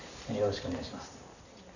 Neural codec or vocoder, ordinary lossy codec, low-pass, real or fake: none; none; 7.2 kHz; real